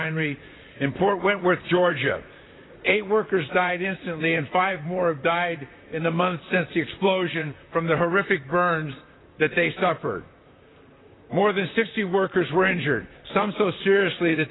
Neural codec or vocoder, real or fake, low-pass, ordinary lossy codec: vocoder, 44.1 kHz, 128 mel bands, Pupu-Vocoder; fake; 7.2 kHz; AAC, 16 kbps